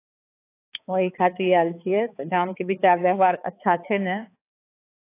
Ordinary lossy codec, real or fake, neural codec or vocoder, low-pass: AAC, 24 kbps; fake; codec, 16 kHz, 4 kbps, X-Codec, HuBERT features, trained on balanced general audio; 3.6 kHz